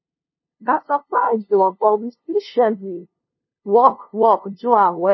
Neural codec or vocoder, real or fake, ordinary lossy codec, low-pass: codec, 16 kHz, 0.5 kbps, FunCodec, trained on LibriTTS, 25 frames a second; fake; MP3, 24 kbps; 7.2 kHz